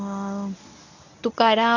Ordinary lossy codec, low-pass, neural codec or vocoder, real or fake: none; 7.2 kHz; codec, 24 kHz, 0.9 kbps, WavTokenizer, medium speech release version 1; fake